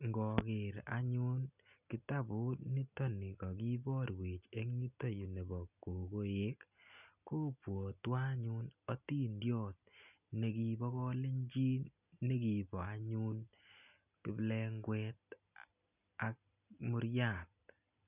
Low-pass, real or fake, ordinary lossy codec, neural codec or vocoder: 3.6 kHz; real; none; none